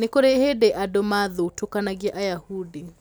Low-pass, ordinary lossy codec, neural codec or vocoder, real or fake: none; none; none; real